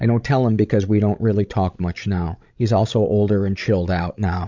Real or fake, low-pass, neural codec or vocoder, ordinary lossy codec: fake; 7.2 kHz; codec, 16 kHz, 16 kbps, FreqCodec, larger model; MP3, 64 kbps